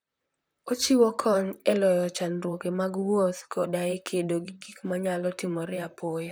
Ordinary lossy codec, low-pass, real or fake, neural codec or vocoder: none; none; fake; vocoder, 44.1 kHz, 128 mel bands, Pupu-Vocoder